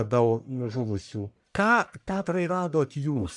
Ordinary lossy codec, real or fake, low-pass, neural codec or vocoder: MP3, 96 kbps; fake; 10.8 kHz; codec, 44.1 kHz, 1.7 kbps, Pupu-Codec